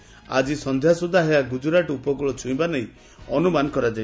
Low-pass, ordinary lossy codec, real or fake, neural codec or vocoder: none; none; real; none